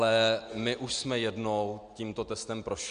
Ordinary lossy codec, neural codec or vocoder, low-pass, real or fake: MP3, 64 kbps; none; 9.9 kHz; real